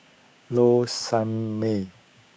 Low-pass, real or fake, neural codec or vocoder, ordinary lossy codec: none; fake; codec, 16 kHz, 6 kbps, DAC; none